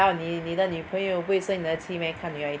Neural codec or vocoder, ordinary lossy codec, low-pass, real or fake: none; none; none; real